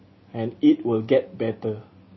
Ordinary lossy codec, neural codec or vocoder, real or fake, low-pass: MP3, 24 kbps; none; real; 7.2 kHz